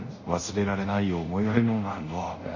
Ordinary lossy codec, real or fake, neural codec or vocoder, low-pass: none; fake; codec, 24 kHz, 0.5 kbps, DualCodec; 7.2 kHz